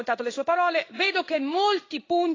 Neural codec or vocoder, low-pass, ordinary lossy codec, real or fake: codec, 16 kHz in and 24 kHz out, 1 kbps, XY-Tokenizer; 7.2 kHz; AAC, 32 kbps; fake